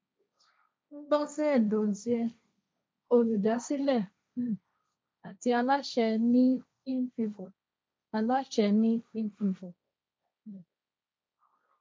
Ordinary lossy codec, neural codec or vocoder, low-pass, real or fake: none; codec, 16 kHz, 1.1 kbps, Voila-Tokenizer; none; fake